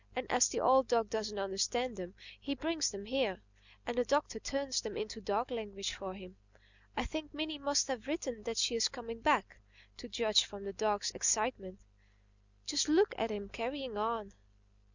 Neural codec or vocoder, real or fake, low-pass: none; real; 7.2 kHz